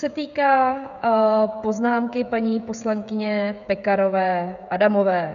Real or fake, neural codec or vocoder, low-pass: fake; codec, 16 kHz, 16 kbps, FreqCodec, smaller model; 7.2 kHz